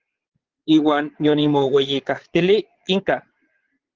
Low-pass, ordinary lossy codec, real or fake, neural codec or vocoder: 7.2 kHz; Opus, 16 kbps; fake; vocoder, 22.05 kHz, 80 mel bands, Vocos